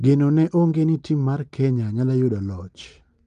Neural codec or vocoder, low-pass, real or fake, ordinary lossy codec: none; 9.9 kHz; real; Opus, 32 kbps